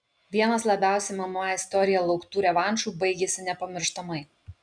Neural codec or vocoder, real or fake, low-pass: none; real; 9.9 kHz